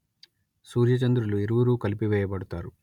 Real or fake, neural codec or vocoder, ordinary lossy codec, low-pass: real; none; none; 19.8 kHz